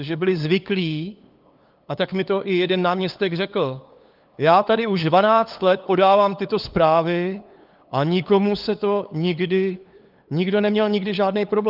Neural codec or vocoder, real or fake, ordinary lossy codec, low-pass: codec, 16 kHz, 8 kbps, FunCodec, trained on LibriTTS, 25 frames a second; fake; Opus, 32 kbps; 5.4 kHz